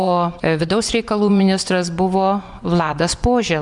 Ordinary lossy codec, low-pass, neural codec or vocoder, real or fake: MP3, 96 kbps; 10.8 kHz; vocoder, 24 kHz, 100 mel bands, Vocos; fake